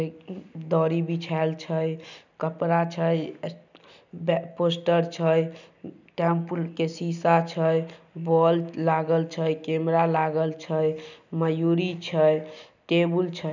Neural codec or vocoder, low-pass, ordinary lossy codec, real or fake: none; 7.2 kHz; none; real